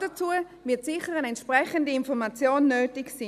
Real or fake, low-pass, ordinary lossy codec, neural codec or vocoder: real; 14.4 kHz; none; none